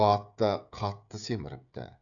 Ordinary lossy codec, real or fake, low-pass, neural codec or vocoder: none; fake; 7.2 kHz; codec, 16 kHz, 16 kbps, FreqCodec, smaller model